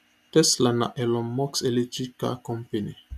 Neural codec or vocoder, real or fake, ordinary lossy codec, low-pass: none; real; none; 14.4 kHz